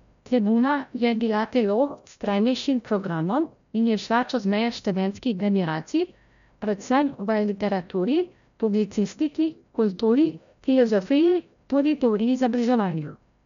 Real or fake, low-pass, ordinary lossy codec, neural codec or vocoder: fake; 7.2 kHz; none; codec, 16 kHz, 0.5 kbps, FreqCodec, larger model